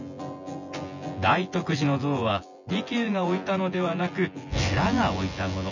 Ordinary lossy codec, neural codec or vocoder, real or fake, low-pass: none; vocoder, 24 kHz, 100 mel bands, Vocos; fake; 7.2 kHz